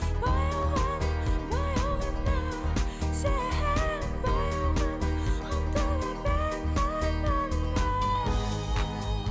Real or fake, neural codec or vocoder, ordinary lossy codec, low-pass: real; none; none; none